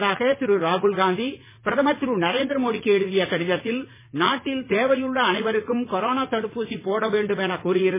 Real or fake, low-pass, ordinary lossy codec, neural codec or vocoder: fake; 3.6 kHz; MP3, 16 kbps; vocoder, 44.1 kHz, 80 mel bands, Vocos